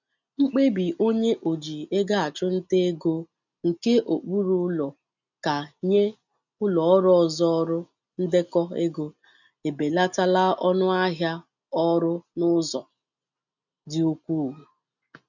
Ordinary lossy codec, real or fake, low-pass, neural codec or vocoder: none; real; 7.2 kHz; none